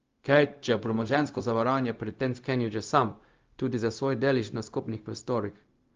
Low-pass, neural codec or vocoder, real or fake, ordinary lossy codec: 7.2 kHz; codec, 16 kHz, 0.4 kbps, LongCat-Audio-Codec; fake; Opus, 32 kbps